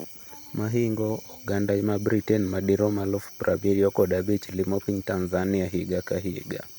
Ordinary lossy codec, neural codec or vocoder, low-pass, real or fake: none; none; none; real